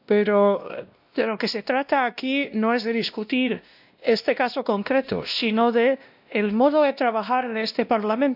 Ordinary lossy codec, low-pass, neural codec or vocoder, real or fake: none; 5.4 kHz; codec, 16 kHz, 1 kbps, X-Codec, WavLM features, trained on Multilingual LibriSpeech; fake